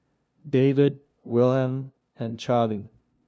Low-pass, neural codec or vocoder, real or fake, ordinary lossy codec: none; codec, 16 kHz, 0.5 kbps, FunCodec, trained on LibriTTS, 25 frames a second; fake; none